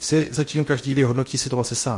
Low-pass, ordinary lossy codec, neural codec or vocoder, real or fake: 10.8 kHz; MP3, 48 kbps; codec, 16 kHz in and 24 kHz out, 0.8 kbps, FocalCodec, streaming, 65536 codes; fake